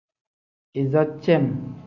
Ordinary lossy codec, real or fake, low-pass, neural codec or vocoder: AAC, 48 kbps; real; 7.2 kHz; none